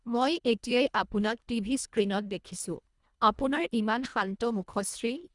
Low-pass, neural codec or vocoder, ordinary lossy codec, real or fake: 10.8 kHz; codec, 24 kHz, 1.5 kbps, HILCodec; Opus, 64 kbps; fake